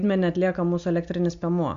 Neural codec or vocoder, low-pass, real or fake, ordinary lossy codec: none; 7.2 kHz; real; MP3, 48 kbps